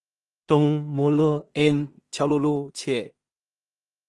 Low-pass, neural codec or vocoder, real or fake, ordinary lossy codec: 10.8 kHz; codec, 16 kHz in and 24 kHz out, 0.4 kbps, LongCat-Audio-Codec, two codebook decoder; fake; Opus, 24 kbps